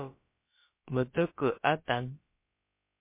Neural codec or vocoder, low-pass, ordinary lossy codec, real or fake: codec, 16 kHz, about 1 kbps, DyCAST, with the encoder's durations; 3.6 kHz; MP3, 24 kbps; fake